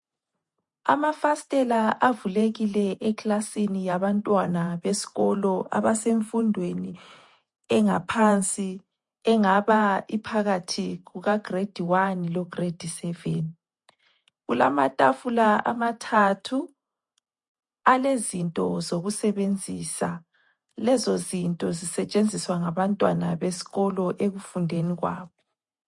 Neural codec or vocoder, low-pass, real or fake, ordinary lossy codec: vocoder, 48 kHz, 128 mel bands, Vocos; 10.8 kHz; fake; MP3, 48 kbps